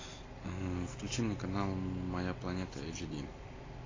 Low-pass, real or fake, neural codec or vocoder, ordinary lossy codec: 7.2 kHz; real; none; AAC, 32 kbps